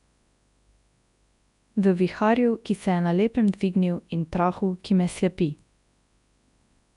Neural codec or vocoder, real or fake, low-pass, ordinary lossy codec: codec, 24 kHz, 0.9 kbps, WavTokenizer, large speech release; fake; 10.8 kHz; none